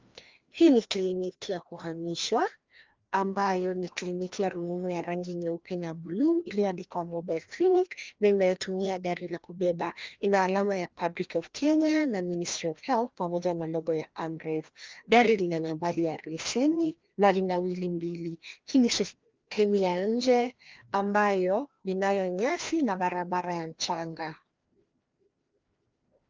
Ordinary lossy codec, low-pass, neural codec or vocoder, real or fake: Opus, 32 kbps; 7.2 kHz; codec, 16 kHz, 1 kbps, FreqCodec, larger model; fake